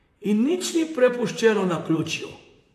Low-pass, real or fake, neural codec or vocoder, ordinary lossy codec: 14.4 kHz; fake; vocoder, 44.1 kHz, 128 mel bands, Pupu-Vocoder; AAC, 64 kbps